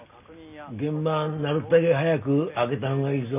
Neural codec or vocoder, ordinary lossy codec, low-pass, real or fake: none; none; 3.6 kHz; real